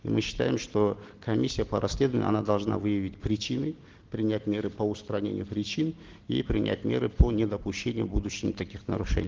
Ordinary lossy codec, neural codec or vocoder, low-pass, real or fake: Opus, 16 kbps; none; 7.2 kHz; real